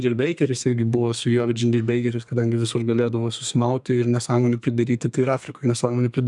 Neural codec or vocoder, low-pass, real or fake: codec, 32 kHz, 1.9 kbps, SNAC; 10.8 kHz; fake